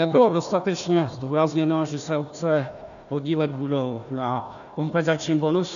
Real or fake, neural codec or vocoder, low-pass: fake; codec, 16 kHz, 1 kbps, FunCodec, trained on Chinese and English, 50 frames a second; 7.2 kHz